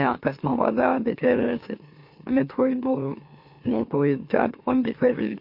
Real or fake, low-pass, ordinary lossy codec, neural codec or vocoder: fake; 5.4 kHz; MP3, 32 kbps; autoencoder, 44.1 kHz, a latent of 192 numbers a frame, MeloTTS